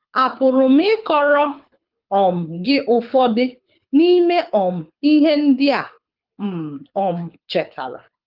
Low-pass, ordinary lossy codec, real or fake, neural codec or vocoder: 5.4 kHz; Opus, 24 kbps; fake; codec, 24 kHz, 6 kbps, HILCodec